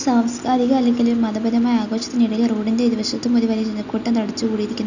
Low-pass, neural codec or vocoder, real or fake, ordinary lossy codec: 7.2 kHz; none; real; none